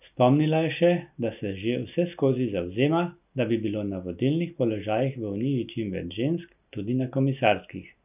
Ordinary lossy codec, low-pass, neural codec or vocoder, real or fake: none; 3.6 kHz; none; real